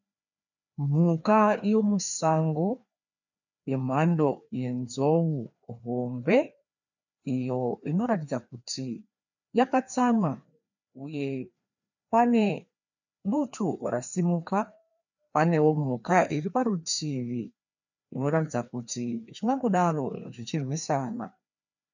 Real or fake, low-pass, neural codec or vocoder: fake; 7.2 kHz; codec, 16 kHz, 2 kbps, FreqCodec, larger model